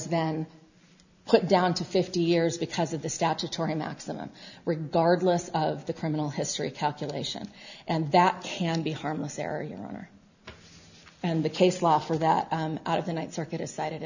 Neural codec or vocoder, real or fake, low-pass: none; real; 7.2 kHz